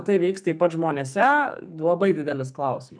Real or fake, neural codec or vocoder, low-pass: fake; codec, 44.1 kHz, 2.6 kbps, SNAC; 9.9 kHz